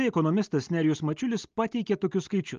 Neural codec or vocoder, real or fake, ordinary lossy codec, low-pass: none; real; Opus, 32 kbps; 7.2 kHz